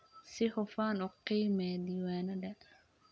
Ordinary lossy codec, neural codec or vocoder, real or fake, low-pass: none; none; real; none